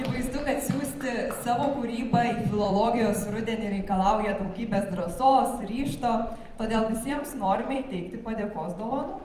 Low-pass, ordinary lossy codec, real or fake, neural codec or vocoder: 14.4 kHz; Opus, 32 kbps; fake; vocoder, 44.1 kHz, 128 mel bands every 256 samples, BigVGAN v2